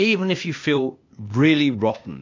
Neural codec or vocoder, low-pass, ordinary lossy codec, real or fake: codec, 16 kHz, 0.8 kbps, ZipCodec; 7.2 kHz; MP3, 48 kbps; fake